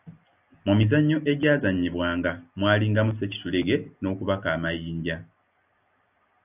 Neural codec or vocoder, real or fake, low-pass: none; real; 3.6 kHz